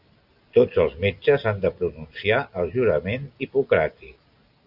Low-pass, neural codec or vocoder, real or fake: 5.4 kHz; none; real